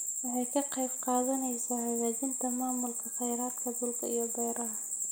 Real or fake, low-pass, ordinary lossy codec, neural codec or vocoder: real; none; none; none